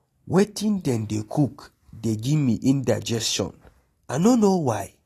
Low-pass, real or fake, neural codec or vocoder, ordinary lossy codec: 14.4 kHz; real; none; AAC, 48 kbps